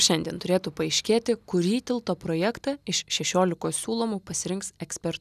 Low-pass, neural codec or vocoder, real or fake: 14.4 kHz; none; real